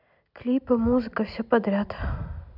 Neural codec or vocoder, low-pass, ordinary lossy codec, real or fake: none; 5.4 kHz; none; real